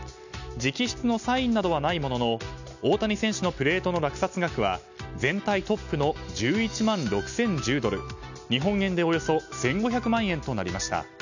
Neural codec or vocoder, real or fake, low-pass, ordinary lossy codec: none; real; 7.2 kHz; none